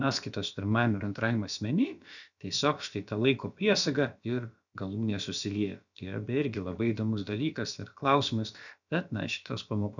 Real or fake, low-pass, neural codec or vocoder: fake; 7.2 kHz; codec, 16 kHz, about 1 kbps, DyCAST, with the encoder's durations